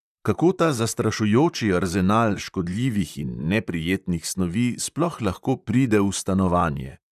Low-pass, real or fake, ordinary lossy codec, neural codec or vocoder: 14.4 kHz; fake; none; vocoder, 44.1 kHz, 128 mel bands, Pupu-Vocoder